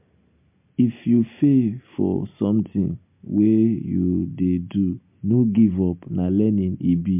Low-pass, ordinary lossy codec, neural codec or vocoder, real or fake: 3.6 kHz; MP3, 32 kbps; none; real